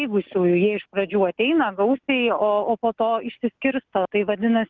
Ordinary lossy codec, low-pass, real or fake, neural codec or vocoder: Opus, 32 kbps; 7.2 kHz; real; none